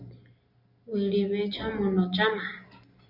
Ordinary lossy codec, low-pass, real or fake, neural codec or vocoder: Opus, 64 kbps; 5.4 kHz; real; none